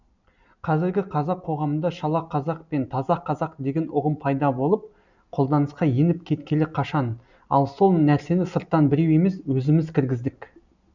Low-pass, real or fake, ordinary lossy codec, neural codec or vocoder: 7.2 kHz; real; none; none